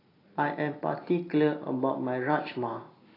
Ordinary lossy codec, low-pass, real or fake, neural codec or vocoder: AAC, 24 kbps; 5.4 kHz; real; none